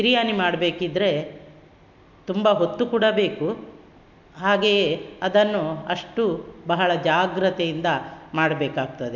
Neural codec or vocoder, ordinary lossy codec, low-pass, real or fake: none; MP3, 64 kbps; 7.2 kHz; real